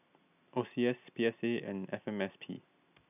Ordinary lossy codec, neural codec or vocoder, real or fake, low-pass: none; none; real; 3.6 kHz